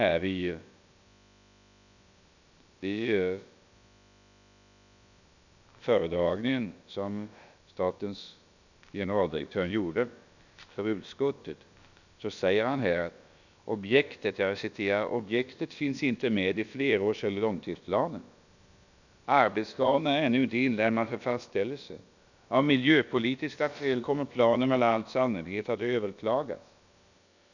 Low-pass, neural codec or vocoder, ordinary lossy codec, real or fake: 7.2 kHz; codec, 16 kHz, about 1 kbps, DyCAST, with the encoder's durations; none; fake